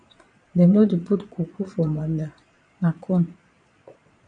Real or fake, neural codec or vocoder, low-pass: fake; vocoder, 22.05 kHz, 80 mel bands, Vocos; 9.9 kHz